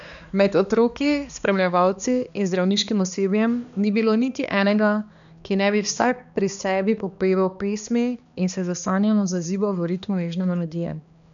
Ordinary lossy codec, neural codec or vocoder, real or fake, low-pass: none; codec, 16 kHz, 2 kbps, X-Codec, HuBERT features, trained on balanced general audio; fake; 7.2 kHz